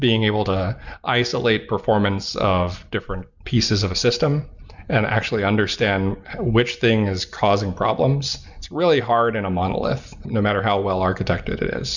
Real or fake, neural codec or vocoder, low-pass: real; none; 7.2 kHz